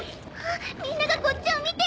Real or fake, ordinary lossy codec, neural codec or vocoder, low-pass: real; none; none; none